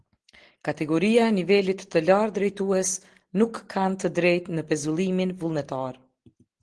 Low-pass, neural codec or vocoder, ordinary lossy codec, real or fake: 9.9 kHz; none; Opus, 16 kbps; real